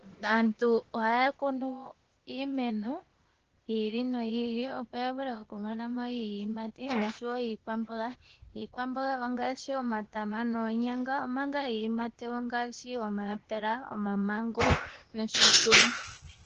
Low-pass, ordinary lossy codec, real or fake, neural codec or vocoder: 7.2 kHz; Opus, 16 kbps; fake; codec, 16 kHz, 0.8 kbps, ZipCodec